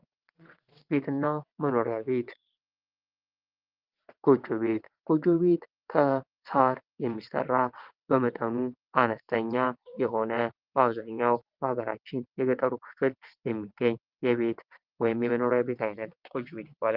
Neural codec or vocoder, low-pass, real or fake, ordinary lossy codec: vocoder, 22.05 kHz, 80 mel bands, WaveNeXt; 5.4 kHz; fake; Opus, 32 kbps